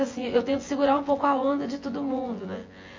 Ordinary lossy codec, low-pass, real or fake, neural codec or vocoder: MP3, 32 kbps; 7.2 kHz; fake; vocoder, 24 kHz, 100 mel bands, Vocos